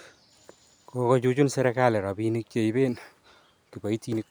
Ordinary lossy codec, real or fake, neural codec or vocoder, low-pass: none; real; none; none